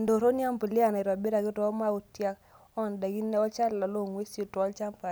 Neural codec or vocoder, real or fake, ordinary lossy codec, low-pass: none; real; none; none